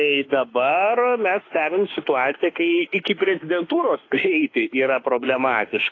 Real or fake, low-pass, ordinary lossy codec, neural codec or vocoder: fake; 7.2 kHz; AAC, 32 kbps; autoencoder, 48 kHz, 32 numbers a frame, DAC-VAE, trained on Japanese speech